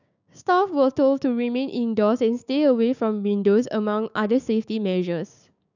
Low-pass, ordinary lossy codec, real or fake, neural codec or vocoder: 7.2 kHz; none; fake; codec, 16 kHz, 6 kbps, DAC